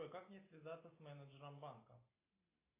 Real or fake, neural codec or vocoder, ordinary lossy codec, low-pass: real; none; AAC, 16 kbps; 3.6 kHz